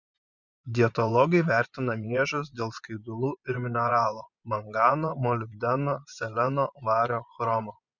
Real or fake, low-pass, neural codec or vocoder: fake; 7.2 kHz; vocoder, 24 kHz, 100 mel bands, Vocos